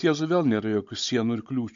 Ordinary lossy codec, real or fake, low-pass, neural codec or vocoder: MP3, 48 kbps; fake; 7.2 kHz; codec, 16 kHz, 16 kbps, FunCodec, trained on Chinese and English, 50 frames a second